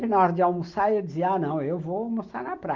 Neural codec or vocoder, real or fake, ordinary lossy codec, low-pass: none; real; Opus, 24 kbps; 7.2 kHz